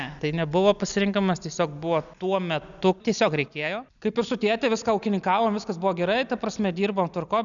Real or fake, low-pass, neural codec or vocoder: real; 7.2 kHz; none